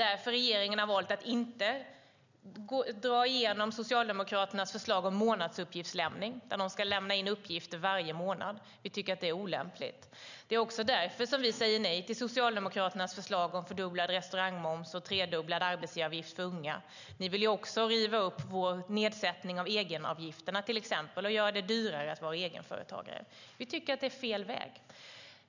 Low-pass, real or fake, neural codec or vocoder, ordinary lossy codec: 7.2 kHz; real; none; none